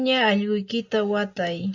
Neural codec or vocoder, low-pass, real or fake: none; 7.2 kHz; real